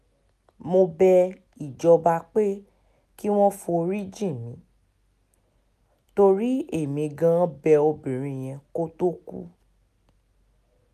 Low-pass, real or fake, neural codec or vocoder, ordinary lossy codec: 14.4 kHz; real; none; none